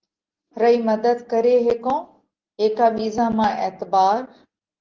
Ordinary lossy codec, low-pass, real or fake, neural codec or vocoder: Opus, 16 kbps; 7.2 kHz; real; none